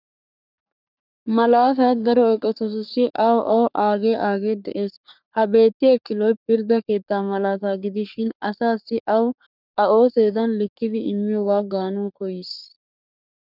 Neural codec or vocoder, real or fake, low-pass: codec, 44.1 kHz, 3.4 kbps, Pupu-Codec; fake; 5.4 kHz